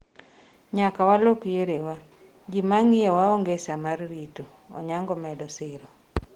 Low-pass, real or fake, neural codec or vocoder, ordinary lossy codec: 19.8 kHz; real; none; Opus, 16 kbps